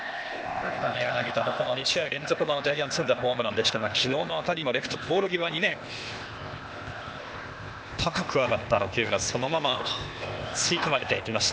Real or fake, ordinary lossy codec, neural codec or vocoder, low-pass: fake; none; codec, 16 kHz, 0.8 kbps, ZipCodec; none